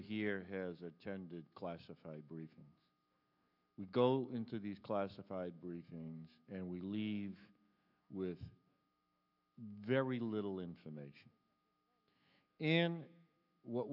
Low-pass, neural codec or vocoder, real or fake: 5.4 kHz; autoencoder, 48 kHz, 128 numbers a frame, DAC-VAE, trained on Japanese speech; fake